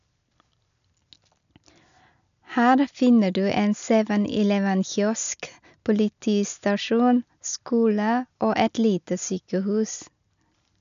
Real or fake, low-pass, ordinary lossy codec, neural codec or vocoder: real; 7.2 kHz; none; none